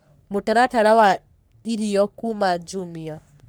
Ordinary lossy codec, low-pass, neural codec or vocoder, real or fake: none; none; codec, 44.1 kHz, 3.4 kbps, Pupu-Codec; fake